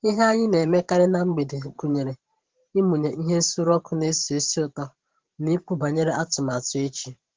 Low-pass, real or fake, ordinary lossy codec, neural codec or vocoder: 7.2 kHz; fake; Opus, 16 kbps; vocoder, 44.1 kHz, 128 mel bands every 512 samples, BigVGAN v2